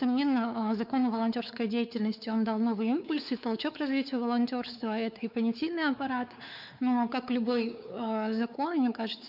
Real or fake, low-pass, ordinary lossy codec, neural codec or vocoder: fake; 5.4 kHz; none; codec, 16 kHz, 4 kbps, X-Codec, WavLM features, trained on Multilingual LibriSpeech